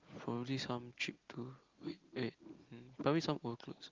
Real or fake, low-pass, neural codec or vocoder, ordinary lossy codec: real; 7.2 kHz; none; Opus, 32 kbps